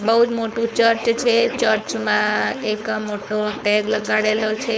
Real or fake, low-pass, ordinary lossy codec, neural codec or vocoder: fake; none; none; codec, 16 kHz, 4.8 kbps, FACodec